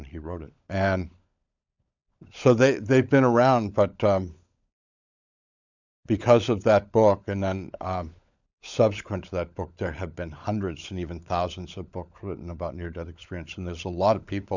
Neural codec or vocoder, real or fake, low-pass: codec, 16 kHz, 16 kbps, FunCodec, trained on LibriTTS, 50 frames a second; fake; 7.2 kHz